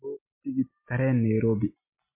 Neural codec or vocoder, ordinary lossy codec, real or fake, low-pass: none; MP3, 24 kbps; real; 3.6 kHz